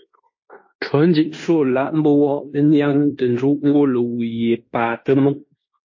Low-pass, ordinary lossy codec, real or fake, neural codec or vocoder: 7.2 kHz; MP3, 32 kbps; fake; codec, 16 kHz in and 24 kHz out, 0.9 kbps, LongCat-Audio-Codec, fine tuned four codebook decoder